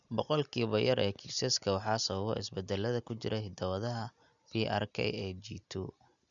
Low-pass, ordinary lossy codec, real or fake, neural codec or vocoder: 7.2 kHz; none; real; none